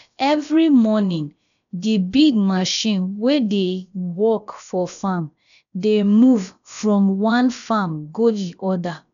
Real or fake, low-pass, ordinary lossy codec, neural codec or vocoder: fake; 7.2 kHz; none; codec, 16 kHz, about 1 kbps, DyCAST, with the encoder's durations